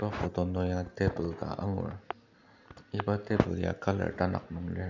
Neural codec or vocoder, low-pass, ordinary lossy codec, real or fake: none; 7.2 kHz; none; real